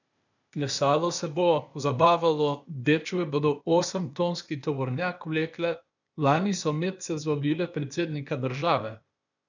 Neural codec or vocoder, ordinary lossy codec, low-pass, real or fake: codec, 16 kHz, 0.8 kbps, ZipCodec; none; 7.2 kHz; fake